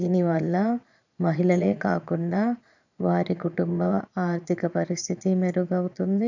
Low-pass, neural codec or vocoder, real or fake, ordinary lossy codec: 7.2 kHz; vocoder, 22.05 kHz, 80 mel bands, Vocos; fake; none